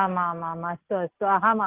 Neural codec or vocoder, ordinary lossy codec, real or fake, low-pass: none; Opus, 32 kbps; real; 3.6 kHz